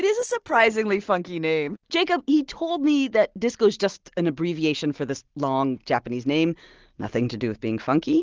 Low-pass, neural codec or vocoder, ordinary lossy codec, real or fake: 7.2 kHz; none; Opus, 16 kbps; real